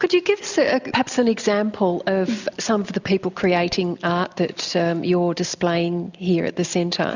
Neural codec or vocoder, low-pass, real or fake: none; 7.2 kHz; real